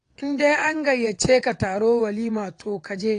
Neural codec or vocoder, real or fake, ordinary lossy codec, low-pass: vocoder, 22.05 kHz, 80 mel bands, WaveNeXt; fake; AAC, 48 kbps; 9.9 kHz